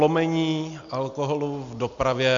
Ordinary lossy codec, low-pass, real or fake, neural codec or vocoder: MP3, 64 kbps; 7.2 kHz; real; none